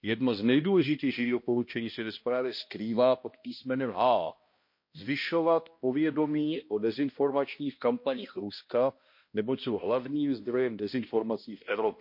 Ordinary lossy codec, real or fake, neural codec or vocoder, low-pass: MP3, 32 kbps; fake; codec, 16 kHz, 1 kbps, X-Codec, HuBERT features, trained on balanced general audio; 5.4 kHz